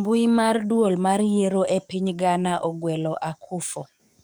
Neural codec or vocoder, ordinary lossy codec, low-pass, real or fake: codec, 44.1 kHz, 7.8 kbps, DAC; none; none; fake